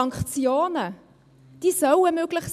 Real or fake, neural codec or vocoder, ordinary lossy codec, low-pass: real; none; none; 14.4 kHz